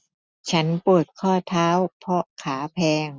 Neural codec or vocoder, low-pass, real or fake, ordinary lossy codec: none; none; real; none